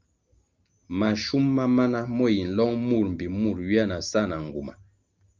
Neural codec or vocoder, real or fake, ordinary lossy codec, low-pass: none; real; Opus, 32 kbps; 7.2 kHz